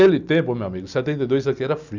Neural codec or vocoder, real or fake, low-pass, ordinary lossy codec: none; real; 7.2 kHz; none